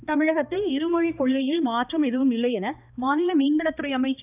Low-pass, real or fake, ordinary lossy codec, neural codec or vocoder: 3.6 kHz; fake; none; codec, 16 kHz, 2 kbps, X-Codec, HuBERT features, trained on balanced general audio